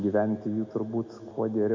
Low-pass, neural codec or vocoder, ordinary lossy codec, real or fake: 7.2 kHz; none; MP3, 48 kbps; real